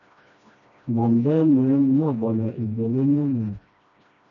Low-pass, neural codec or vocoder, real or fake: 7.2 kHz; codec, 16 kHz, 1 kbps, FreqCodec, smaller model; fake